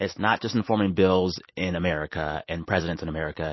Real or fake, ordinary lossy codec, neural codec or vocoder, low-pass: real; MP3, 24 kbps; none; 7.2 kHz